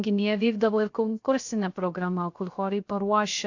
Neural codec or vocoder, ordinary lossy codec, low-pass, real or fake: codec, 16 kHz, 0.3 kbps, FocalCodec; AAC, 48 kbps; 7.2 kHz; fake